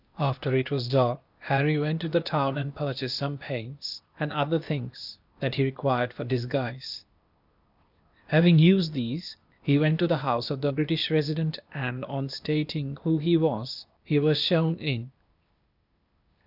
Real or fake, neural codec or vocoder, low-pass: fake; codec, 16 kHz, 0.8 kbps, ZipCodec; 5.4 kHz